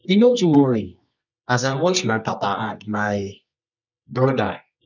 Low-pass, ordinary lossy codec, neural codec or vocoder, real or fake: 7.2 kHz; none; codec, 24 kHz, 0.9 kbps, WavTokenizer, medium music audio release; fake